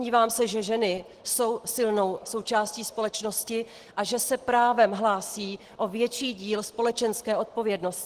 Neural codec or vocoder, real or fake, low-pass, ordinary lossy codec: none; real; 14.4 kHz; Opus, 16 kbps